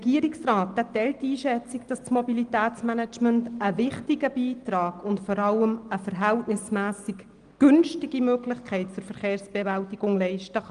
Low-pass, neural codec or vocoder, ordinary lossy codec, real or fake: 9.9 kHz; none; Opus, 24 kbps; real